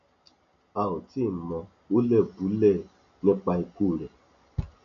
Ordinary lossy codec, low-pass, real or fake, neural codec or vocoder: none; 7.2 kHz; real; none